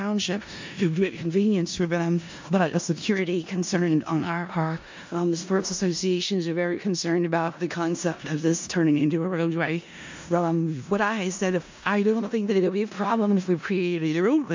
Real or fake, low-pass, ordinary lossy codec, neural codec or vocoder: fake; 7.2 kHz; MP3, 48 kbps; codec, 16 kHz in and 24 kHz out, 0.4 kbps, LongCat-Audio-Codec, four codebook decoder